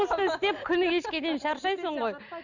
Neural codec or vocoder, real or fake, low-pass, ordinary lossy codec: none; real; 7.2 kHz; none